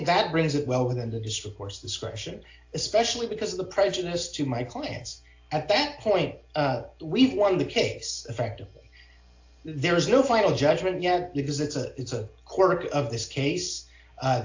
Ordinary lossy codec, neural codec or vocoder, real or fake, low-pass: AAC, 48 kbps; none; real; 7.2 kHz